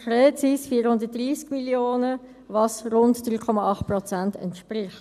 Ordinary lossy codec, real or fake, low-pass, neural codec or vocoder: none; real; 14.4 kHz; none